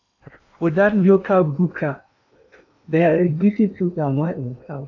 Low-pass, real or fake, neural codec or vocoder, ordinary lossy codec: 7.2 kHz; fake; codec, 16 kHz in and 24 kHz out, 0.8 kbps, FocalCodec, streaming, 65536 codes; AAC, 48 kbps